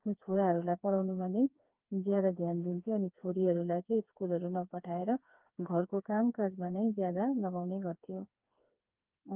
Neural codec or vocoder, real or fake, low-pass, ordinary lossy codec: codec, 16 kHz, 4 kbps, FreqCodec, smaller model; fake; 3.6 kHz; Opus, 24 kbps